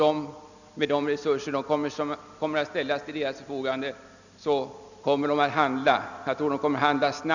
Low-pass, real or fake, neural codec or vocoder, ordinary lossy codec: 7.2 kHz; real; none; none